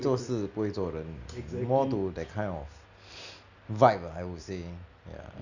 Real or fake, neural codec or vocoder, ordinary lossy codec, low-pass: real; none; none; 7.2 kHz